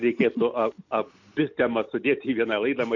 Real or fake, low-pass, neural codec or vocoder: real; 7.2 kHz; none